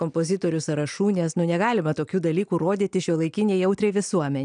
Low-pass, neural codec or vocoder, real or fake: 9.9 kHz; none; real